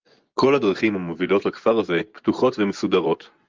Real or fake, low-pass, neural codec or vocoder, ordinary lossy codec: fake; 7.2 kHz; vocoder, 24 kHz, 100 mel bands, Vocos; Opus, 24 kbps